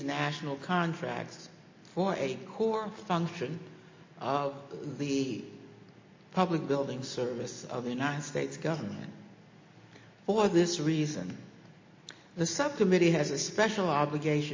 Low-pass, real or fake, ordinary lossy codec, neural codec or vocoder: 7.2 kHz; real; MP3, 32 kbps; none